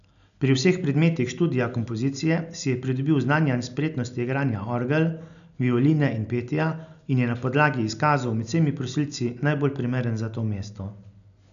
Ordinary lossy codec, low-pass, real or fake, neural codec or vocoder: none; 7.2 kHz; real; none